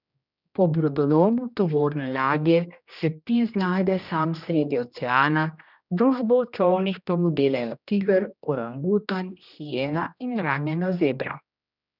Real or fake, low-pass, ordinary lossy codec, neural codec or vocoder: fake; 5.4 kHz; none; codec, 16 kHz, 1 kbps, X-Codec, HuBERT features, trained on general audio